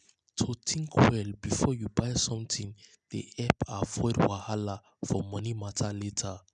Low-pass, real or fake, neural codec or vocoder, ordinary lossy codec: 9.9 kHz; real; none; none